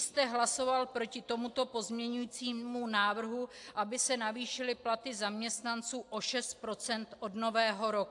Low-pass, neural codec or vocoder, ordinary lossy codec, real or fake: 10.8 kHz; none; AAC, 64 kbps; real